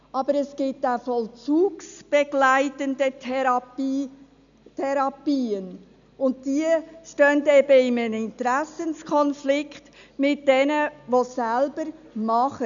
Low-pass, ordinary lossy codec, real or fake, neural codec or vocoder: 7.2 kHz; none; real; none